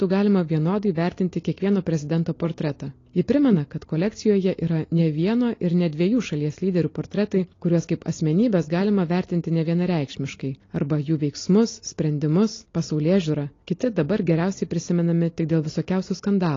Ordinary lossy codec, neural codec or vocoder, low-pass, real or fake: AAC, 32 kbps; none; 7.2 kHz; real